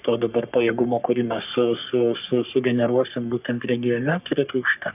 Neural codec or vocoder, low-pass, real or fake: codec, 44.1 kHz, 3.4 kbps, Pupu-Codec; 3.6 kHz; fake